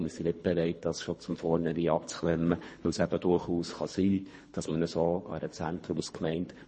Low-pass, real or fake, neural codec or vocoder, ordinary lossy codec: 10.8 kHz; fake; codec, 24 kHz, 3 kbps, HILCodec; MP3, 32 kbps